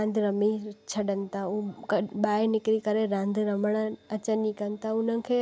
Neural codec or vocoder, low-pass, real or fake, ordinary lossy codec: none; none; real; none